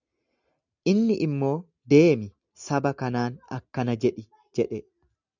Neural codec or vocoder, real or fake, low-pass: none; real; 7.2 kHz